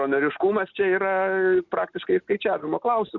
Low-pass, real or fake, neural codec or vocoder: 7.2 kHz; real; none